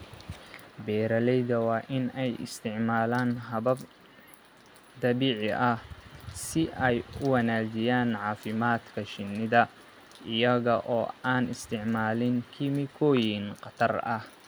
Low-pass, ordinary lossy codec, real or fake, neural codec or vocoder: none; none; real; none